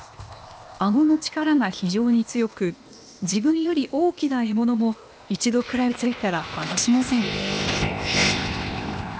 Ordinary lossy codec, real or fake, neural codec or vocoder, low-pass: none; fake; codec, 16 kHz, 0.8 kbps, ZipCodec; none